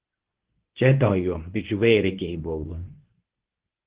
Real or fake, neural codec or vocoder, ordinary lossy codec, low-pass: fake; codec, 24 kHz, 0.9 kbps, WavTokenizer, medium speech release version 1; Opus, 16 kbps; 3.6 kHz